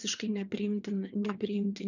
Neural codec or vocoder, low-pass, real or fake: vocoder, 22.05 kHz, 80 mel bands, WaveNeXt; 7.2 kHz; fake